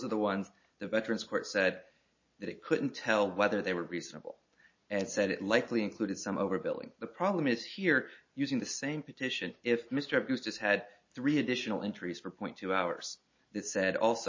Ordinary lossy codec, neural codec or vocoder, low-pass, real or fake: MP3, 32 kbps; none; 7.2 kHz; real